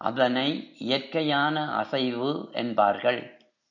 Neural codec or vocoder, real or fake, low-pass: none; real; 7.2 kHz